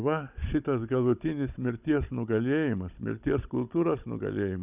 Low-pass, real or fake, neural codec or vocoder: 3.6 kHz; fake; codec, 16 kHz, 16 kbps, FunCodec, trained on Chinese and English, 50 frames a second